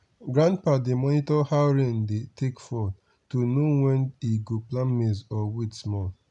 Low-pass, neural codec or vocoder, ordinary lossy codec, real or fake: 10.8 kHz; none; none; real